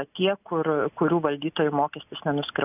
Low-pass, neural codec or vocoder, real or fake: 3.6 kHz; none; real